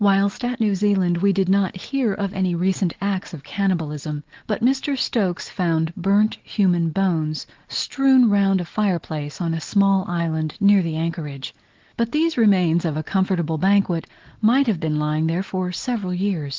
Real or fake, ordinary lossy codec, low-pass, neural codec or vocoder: real; Opus, 16 kbps; 7.2 kHz; none